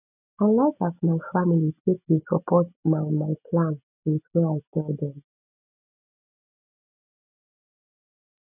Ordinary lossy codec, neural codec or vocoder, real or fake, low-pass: none; none; real; 3.6 kHz